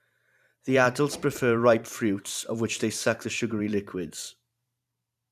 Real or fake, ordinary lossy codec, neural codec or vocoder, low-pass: fake; none; vocoder, 44.1 kHz, 128 mel bands every 512 samples, BigVGAN v2; 14.4 kHz